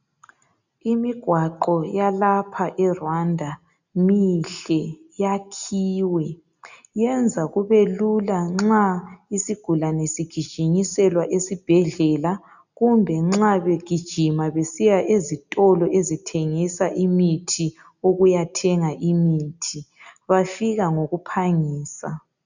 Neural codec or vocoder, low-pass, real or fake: none; 7.2 kHz; real